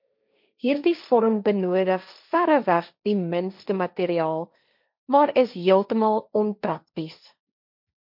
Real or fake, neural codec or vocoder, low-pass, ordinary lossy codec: fake; codec, 16 kHz, 1.1 kbps, Voila-Tokenizer; 5.4 kHz; MP3, 48 kbps